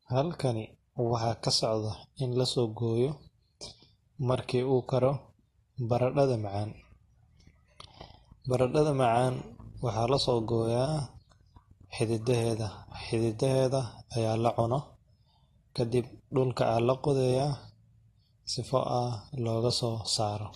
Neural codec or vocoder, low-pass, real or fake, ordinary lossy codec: none; 19.8 kHz; real; AAC, 32 kbps